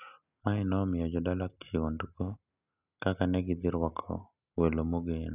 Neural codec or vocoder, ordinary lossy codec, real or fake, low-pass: none; none; real; 3.6 kHz